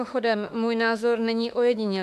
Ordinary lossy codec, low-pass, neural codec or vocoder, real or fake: AAC, 96 kbps; 14.4 kHz; autoencoder, 48 kHz, 32 numbers a frame, DAC-VAE, trained on Japanese speech; fake